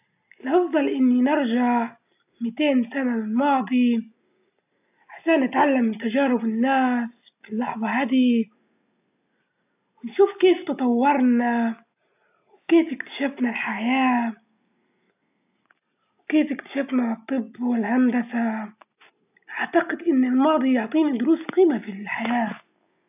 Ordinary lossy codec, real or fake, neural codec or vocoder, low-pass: AAC, 32 kbps; real; none; 3.6 kHz